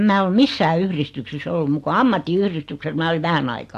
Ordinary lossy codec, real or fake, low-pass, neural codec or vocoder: AAC, 64 kbps; real; 14.4 kHz; none